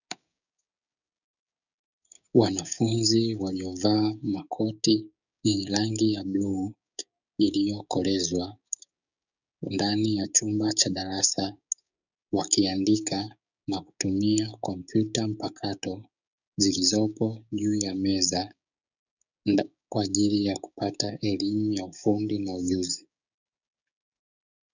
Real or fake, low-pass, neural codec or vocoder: fake; 7.2 kHz; codec, 16 kHz, 6 kbps, DAC